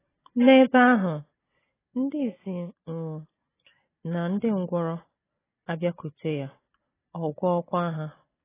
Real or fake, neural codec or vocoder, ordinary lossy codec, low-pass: real; none; AAC, 16 kbps; 3.6 kHz